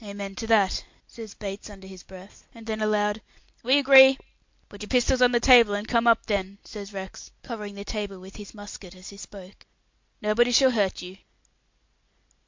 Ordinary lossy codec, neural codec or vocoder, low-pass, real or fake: MP3, 48 kbps; none; 7.2 kHz; real